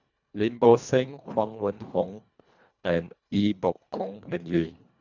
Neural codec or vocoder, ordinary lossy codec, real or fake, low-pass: codec, 24 kHz, 1.5 kbps, HILCodec; none; fake; 7.2 kHz